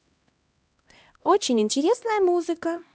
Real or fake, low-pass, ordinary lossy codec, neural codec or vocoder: fake; none; none; codec, 16 kHz, 1 kbps, X-Codec, HuBERT features, trained on LibriSpeech